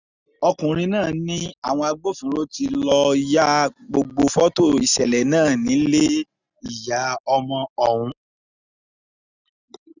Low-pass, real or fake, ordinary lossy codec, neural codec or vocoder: 7.2 kHz; real; none; none